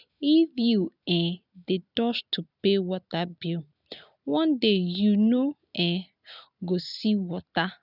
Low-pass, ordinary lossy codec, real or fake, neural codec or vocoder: 5.4 kHz; none; real; none